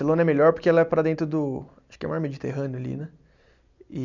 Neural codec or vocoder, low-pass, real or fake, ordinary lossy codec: none; 7.2 kHz; real; none